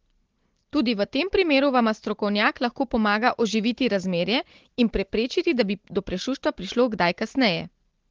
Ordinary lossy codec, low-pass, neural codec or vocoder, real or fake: Opus, 16 kbps; 7.2 kHz; none; real